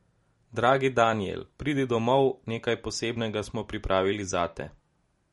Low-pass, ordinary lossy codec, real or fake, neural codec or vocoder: 19.8 kHz; MP3, 48 kbps; real; none